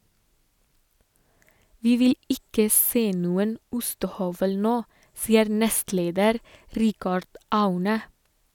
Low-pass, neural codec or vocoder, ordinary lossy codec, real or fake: 19.8 kHz; none; none; real